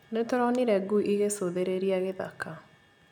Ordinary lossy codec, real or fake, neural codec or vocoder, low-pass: none; real; none; 19.8 kHz